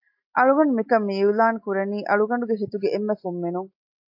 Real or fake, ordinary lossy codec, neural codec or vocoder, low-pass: real; AAC, 48 kbps; none; 5.4 kHz